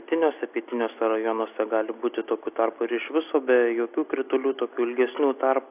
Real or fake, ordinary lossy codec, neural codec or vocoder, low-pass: real; AAC, 32 kbps; none; 3.6 kHz